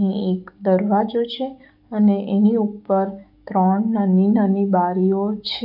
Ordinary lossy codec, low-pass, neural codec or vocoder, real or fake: none; 5.4 kHz; codec, 44.1 kHz, 7.8 kbps, DAC; fake